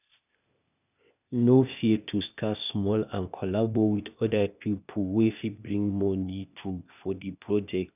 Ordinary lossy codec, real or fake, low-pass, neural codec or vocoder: none; fake; 3.6 kHz; codec, 16 kHz, 0.8 kbps, ZipCodec